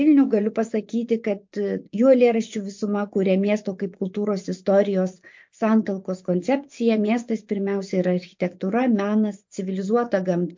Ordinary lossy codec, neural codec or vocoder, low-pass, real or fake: MP3, 48 kbps; vocoder, 24 kHz, 100 mel bands, Vocos; 7.2 kHz; fake